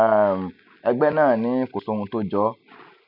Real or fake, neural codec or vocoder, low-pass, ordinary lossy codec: real; none; 5.4 kHz; none